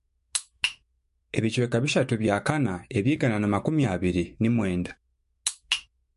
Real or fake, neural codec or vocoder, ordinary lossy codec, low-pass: fake; autoencoder, 48 kHz, 128 numbers a frame, DAC-VAE, trained on Japanese speech; MP3, 48 kbps; 14.4 kHz